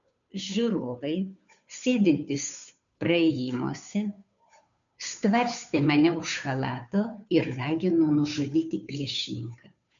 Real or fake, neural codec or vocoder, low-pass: fake; codec, 16 kHz, 2 kbps, FunCodec, trained on Chinese and English, 25 frames a second; 7.2 kHz